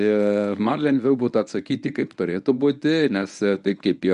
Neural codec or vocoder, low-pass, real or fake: codec, 24 kHz, 0.9 kbps, WavTokenizer, medium speech release version 1; 10.8 kHz; fake